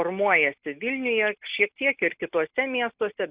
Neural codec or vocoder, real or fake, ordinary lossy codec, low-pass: none; real; Opus, 64 kbps; 3.6 kHz